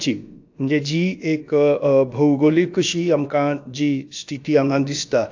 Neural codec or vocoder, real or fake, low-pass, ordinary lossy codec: codec, 16 kHz, about 1 kbps, DyCAST, with the encoder's durations; fake; 7.2 kHz; AAC, 48 kbps